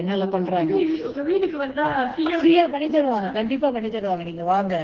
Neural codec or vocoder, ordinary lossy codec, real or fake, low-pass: codec, 16 kHz, 2 kbps, FreqCodec, smaller model; Opus, 16 kbps; fake; 7.2 kHz